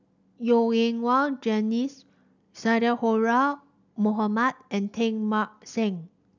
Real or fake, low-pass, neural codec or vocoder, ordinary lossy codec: real; 7.2 kHz; none; none